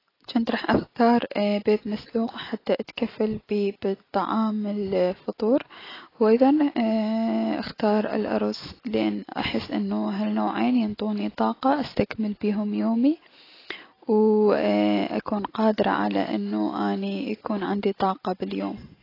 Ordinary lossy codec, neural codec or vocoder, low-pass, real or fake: AAC, 24 kbps; none; 5.4 kHz; real